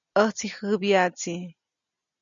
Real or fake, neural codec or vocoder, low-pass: real; none; 7.2 kHz